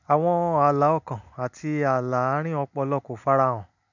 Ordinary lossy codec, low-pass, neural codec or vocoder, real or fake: none; 7.2 kHz; none; real